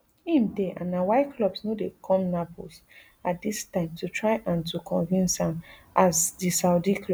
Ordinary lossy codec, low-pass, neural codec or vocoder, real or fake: none; none; none; real